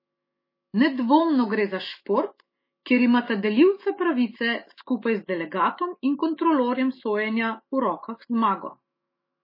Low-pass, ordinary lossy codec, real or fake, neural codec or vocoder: 5.4 kHz; MP3, 24 kbps; fake; autoencoder, 48 kHz, 128 numbers a frame, DAC-VAE, trained on Japanese speech